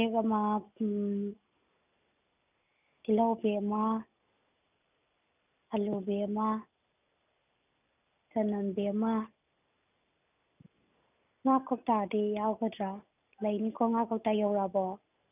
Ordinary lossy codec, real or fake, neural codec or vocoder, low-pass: none; real; none; 3.6 kHz